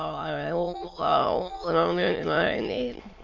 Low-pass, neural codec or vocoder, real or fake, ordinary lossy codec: 7.2 kHz; autoencoder, 22.05 kHz, a latent of 192 numbers a frame, VITS, trained on many speakers; fake; MP3, 64 kbps